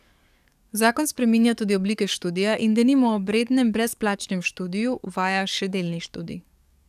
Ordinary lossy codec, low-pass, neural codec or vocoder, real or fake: none; 14.4 kHz; codec, 44.1 kHz, 7.8 kbps, DAC; fake